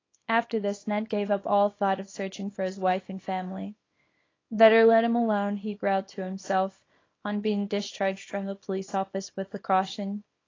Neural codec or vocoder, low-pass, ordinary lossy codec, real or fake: codec, 24 kHz, 0.9 kbps, WavTokenizer, small release; 7.2 kHz; AAC, 32 kbps; fake